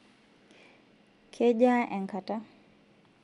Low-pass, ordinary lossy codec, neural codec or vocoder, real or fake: 10.8 kHz; none; none; real